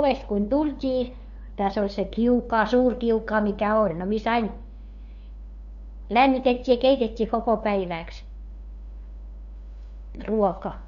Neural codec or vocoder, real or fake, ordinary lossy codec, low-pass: codec, 16 kHz, 2 kbps, FunCodec, trained on LibriTTS, 25 frames a second; fake; none; 7.2 kHz